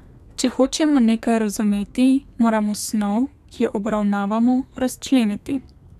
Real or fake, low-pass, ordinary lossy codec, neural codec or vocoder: fake; 14.4 kHz; none; codec, 32 kHz, 1.9 kbps, SNAC